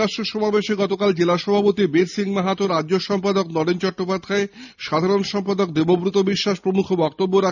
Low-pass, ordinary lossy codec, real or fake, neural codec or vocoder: 7.2 kHz; none; real; none